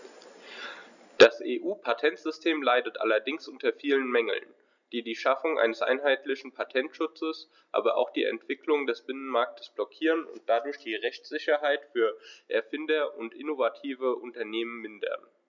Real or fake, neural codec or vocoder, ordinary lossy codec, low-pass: real; none; none; 7.2 kHz